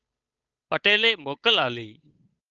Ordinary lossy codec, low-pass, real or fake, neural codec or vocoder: Opus, 24 kbps; 7.2 kHz; fake; codec, 16 kHz, 8 kbps, FunCodec, trained on Chinese and English, 25 frames a second